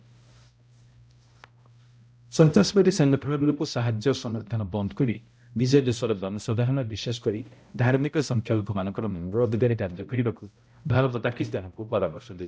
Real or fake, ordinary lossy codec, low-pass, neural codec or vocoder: fake; none; none; codec, 16 kHz, 0.5 kbps, X-Codec, HuBERT features, trained on balanced general audio